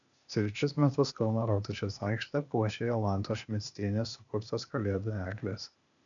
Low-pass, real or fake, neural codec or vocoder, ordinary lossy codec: 7.2 kHz; fake; codec, 16 kHz, 0.8 kbps, ZipCodec; MP3, 96 kbps